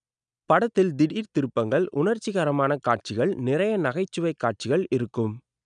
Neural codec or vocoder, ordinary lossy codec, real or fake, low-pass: none; none; real; 9.9 kHz